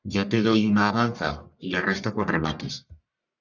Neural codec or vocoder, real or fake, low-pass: codec, 44.1 kHz, 1.7 kbps, Pupu-Codec; fake; 7.2 kHz